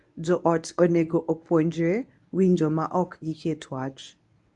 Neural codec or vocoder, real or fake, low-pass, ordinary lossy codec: codec, 24 kHz, 0.9 kbps, WavTokenizer, medium speech release version 1; fake; 10.8 kHz; AAC, 64 kbps